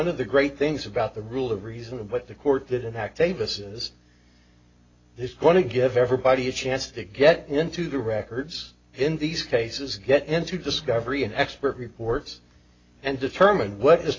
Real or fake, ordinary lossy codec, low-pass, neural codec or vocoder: real; AAC, 32 kbps; 7.2 kHz; none